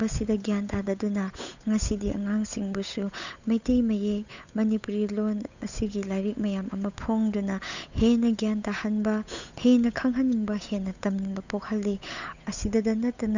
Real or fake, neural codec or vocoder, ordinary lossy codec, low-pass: fake; codec, 16 kHz, 8 kbps, FunCodec, trained on Chinese and English, 25 frames a second; none; 7.2 kHz